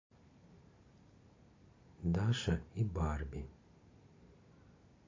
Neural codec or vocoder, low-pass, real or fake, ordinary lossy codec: none; 7.2 kHz; real; MP3, 32 kbps